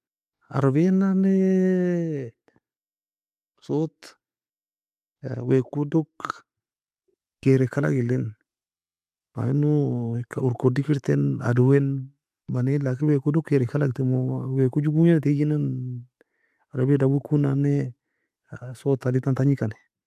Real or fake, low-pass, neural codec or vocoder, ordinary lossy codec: real; 14.4 kHz; none; AAC, 96 kbps